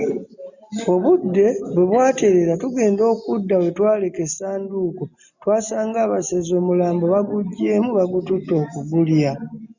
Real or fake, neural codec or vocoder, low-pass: real; none; 7.2 kHz